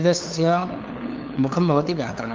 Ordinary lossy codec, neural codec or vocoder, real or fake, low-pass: Opus, 32 kbps; codec, 16 kHz, 2 kbps, FunCodec, trained on LibriTTS, 25 frames a second; fake; 7.2 kHz